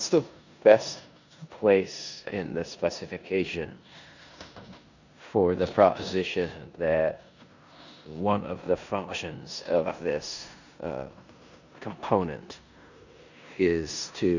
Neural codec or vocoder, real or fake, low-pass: codec, 16 kHz in and 24 kHz out, 0.9 kbps, LongCat-Audio-Codec, four codebook decoder; fake; 7.2 kHz